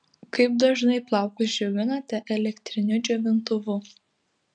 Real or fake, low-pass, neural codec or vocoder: real; 9.9 kHz; none